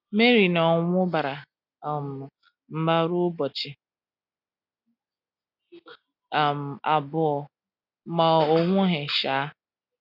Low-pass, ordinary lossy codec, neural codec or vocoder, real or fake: 5.4 kHz; none; none; real